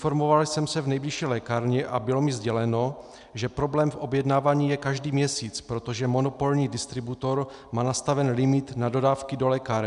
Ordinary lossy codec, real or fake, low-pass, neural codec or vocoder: MP3, 96 kbps; real; 10.8 kHz; none